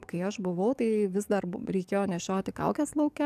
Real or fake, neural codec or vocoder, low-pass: fake; codec, 44.1 kHz, 7.8 kbps, DAC; 14.4 kHz